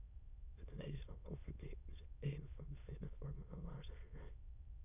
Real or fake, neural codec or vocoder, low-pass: fake; autoencoder, 22.05 kHz, a latent of 192 numbers a frame, VITS, trained on many speakers; 3.6 kHz